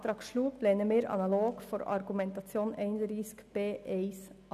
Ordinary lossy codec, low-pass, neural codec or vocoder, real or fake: none; 14.4 kHz; none; real